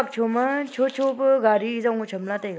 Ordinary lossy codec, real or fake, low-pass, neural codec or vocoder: none; real; none; none